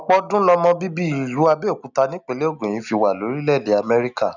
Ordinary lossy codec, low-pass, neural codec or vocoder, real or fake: none; 7.2 kHz; none; real